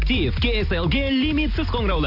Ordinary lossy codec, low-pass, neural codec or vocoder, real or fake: none; 5.4 kHz; none; real